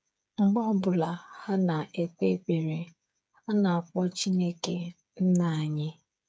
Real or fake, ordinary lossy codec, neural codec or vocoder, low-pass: fake; none; codec, 16 kHz, 8 kbps, FreqCodec, smaller model; none